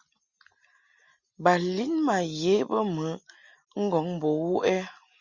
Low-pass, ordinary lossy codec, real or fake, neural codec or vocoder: 7.2 kHz; Opus, 64 kbps; real; none